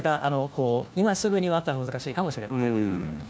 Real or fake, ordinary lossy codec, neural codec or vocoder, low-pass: fake; none; codec, 16 kHz, 1 kbps, FunCodec, trained on LibriTTS, 50 frames a second; none